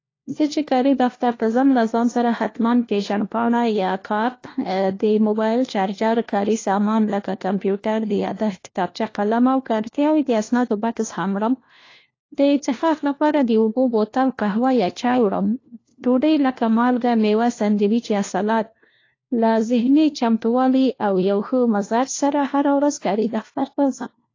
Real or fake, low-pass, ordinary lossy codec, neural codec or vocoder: fake; 7.2 kHz; AAC, 32 kbps; codec, 16 kHz, 1 kbps, FunCodec, trained on LibriTTS, 50 frames a second